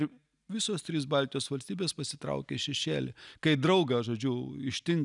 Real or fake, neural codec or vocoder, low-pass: real; none; 10.8 kHz